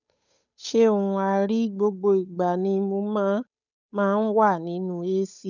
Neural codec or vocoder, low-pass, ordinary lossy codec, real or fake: codec, 16 kHz, 8 kbps, FunCodec, trained on Chinese and English, 25 frames a second; 7.2 kHz; none; fake